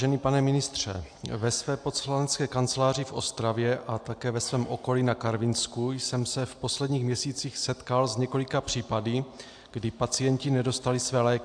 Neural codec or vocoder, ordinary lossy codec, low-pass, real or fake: none; AAC, 64 kbps; 9.9 kHz; real